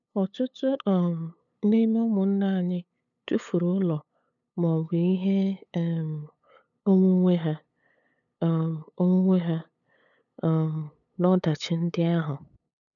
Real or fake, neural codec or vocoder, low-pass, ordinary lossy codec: fake; codec, 16 kHz, 8 kbps, FunCodec, trained on LibriTTS, 25 frames a second; 7.2 kHz; none